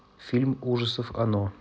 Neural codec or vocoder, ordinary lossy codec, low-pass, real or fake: none; none; none; real